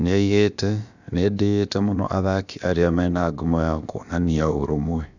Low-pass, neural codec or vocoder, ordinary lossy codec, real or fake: 7.2 kHz; codec, 16 kHz, about 1 kbps, DyCAST, with the encoder's durations; none; fake